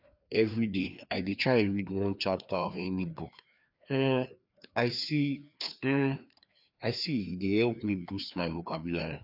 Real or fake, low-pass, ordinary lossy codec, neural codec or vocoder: fake; 5.4 kHz; none; codec, 16 kHz, 2 kbps, FreqCodec, larger model